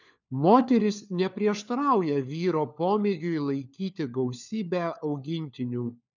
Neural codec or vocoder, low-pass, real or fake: codec, 16 kHz, 4 kbps, FunCodec, trained on LibriTTS, 50 frames a second; 7.2 kHz; fake